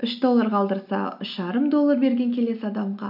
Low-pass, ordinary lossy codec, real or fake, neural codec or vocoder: 5.4 kHz; none; real; none